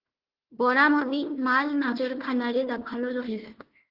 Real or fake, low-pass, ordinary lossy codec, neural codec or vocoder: fake; 5.4 kHz; Opus, 16 kbps; codec, 16 kHz, 1 kbps, FunCodec, trained on Chinese and English, 50 frames a second